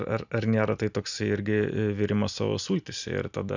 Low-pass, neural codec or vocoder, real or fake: 7.2 kHz; none; real